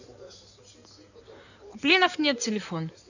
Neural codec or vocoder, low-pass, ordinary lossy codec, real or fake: codec, 16 kHz in and 24 kHz out, 2.2 kbps, FireRedTTS-2 codec; 7.2 kHz; none; fake